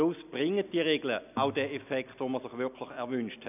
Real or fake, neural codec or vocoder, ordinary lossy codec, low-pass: real; none; none; 3.6 kHz